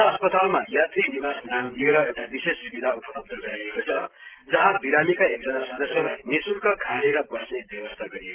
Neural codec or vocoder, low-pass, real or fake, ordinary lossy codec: none; 3.6 kHz; real; Opus, 16 kbps